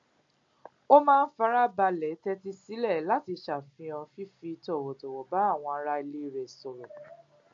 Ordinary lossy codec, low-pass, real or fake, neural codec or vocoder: MP3, 48 kbps; 7.2 kHz; real; none